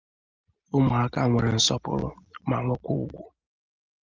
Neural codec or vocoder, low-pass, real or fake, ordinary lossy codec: none; 7.2 kHz; real; Opus, 32 kbps